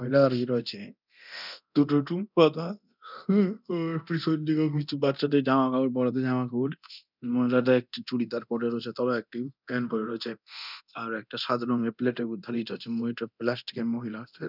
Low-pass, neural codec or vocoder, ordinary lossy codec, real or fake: 5.4 kHz; codec, 24 kHz, 0.9 kbps, DualCodec; none; fake